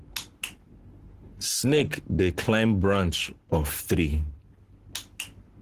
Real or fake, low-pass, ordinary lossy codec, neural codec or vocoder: fake; 14.4 kHz; Opus, 16 kbps; codec, 44.1 kHz, 7.8 kbps, Pupu-Codec